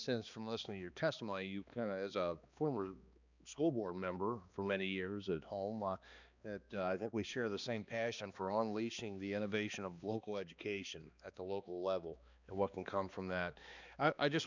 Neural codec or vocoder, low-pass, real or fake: codec, 16 kHz, 2 kbps, X-Codec, HuBERT features, trained on balanced general audio; 7.2 kHz; fake